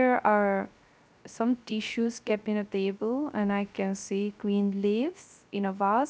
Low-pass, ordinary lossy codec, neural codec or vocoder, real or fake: none; none; codec, 16 kHz, 0.3 kbps, FocalCodec; fake